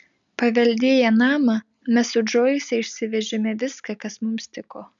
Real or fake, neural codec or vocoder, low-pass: real; none; 7.2 kHz